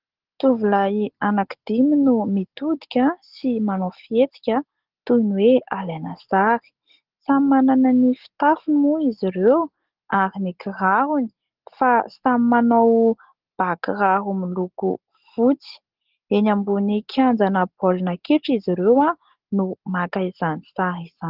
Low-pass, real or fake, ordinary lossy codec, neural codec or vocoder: 5.4 kHz; real; Opus, 16 kbps; none